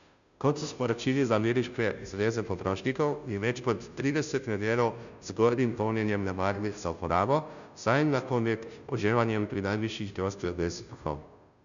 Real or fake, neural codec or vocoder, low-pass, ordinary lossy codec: fake; codec, 16 kHz, 0.5 kbps, FunCodec, trained on Chinese and English, 25 frames a second; 7.2 kHz; none